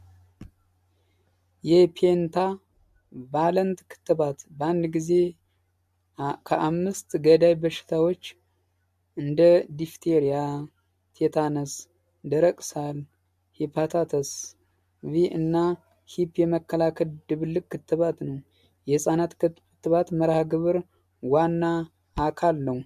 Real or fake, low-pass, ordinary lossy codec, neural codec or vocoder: real; 14.4 kHz; MP3, 64 kbps; none